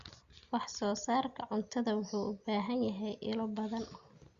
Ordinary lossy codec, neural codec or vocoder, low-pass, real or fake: Opus, 64 kbps; none; 7.2 kHz; real